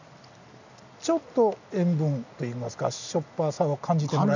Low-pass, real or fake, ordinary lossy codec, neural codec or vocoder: 7.2 kHz; real; none; none